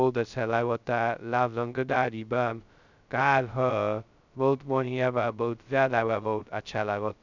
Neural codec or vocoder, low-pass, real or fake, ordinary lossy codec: codec, 16 kHz, 0.2 kbps, FocalCodec; 7.2 kHz; fake; none